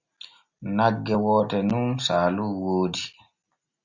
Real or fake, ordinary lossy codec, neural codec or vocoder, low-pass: real; Opus, 64 kbps; none; 7.2 kHz